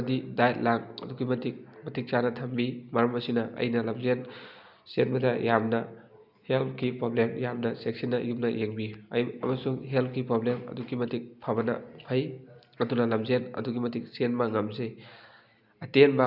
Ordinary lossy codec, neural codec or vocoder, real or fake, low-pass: none; none; real; 5.4 kHz